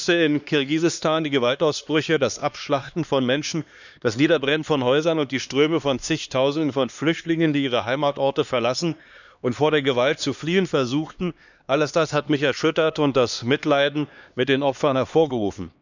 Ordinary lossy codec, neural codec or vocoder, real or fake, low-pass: none; codec, 16 kHz, 2 kbps, X-Codec, HuBERT features, trained on LibriSpeech; fake; 7.2 kHz